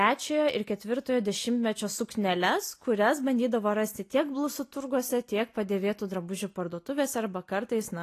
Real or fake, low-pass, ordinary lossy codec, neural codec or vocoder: real; 14.4 kHz; AAC, 48 kbps; none